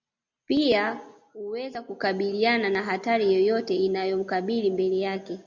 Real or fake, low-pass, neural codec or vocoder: real; 7.2 kHz; none